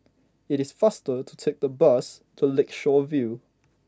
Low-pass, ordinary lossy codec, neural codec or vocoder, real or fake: none; none; none; real